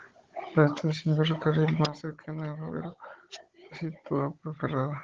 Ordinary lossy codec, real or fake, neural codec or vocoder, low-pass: Opus, 16 kbps; fake; codec, 16 kHz, 16 kbps, FunCodec, trained on Chinese and English, 50 frames a second; 7.2 kHz